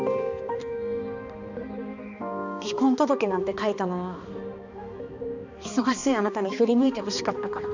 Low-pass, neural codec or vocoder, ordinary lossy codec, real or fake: 7.2 kHz; codec, 16 kHz, 2 kbps, X-Codec, HuBERT features, trained on balanced general audio; none; fake